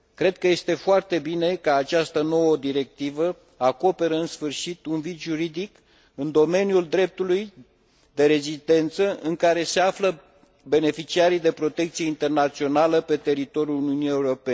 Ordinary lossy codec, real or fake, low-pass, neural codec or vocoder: none; real; none; none